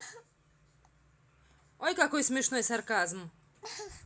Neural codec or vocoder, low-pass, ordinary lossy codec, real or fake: none; none; none; real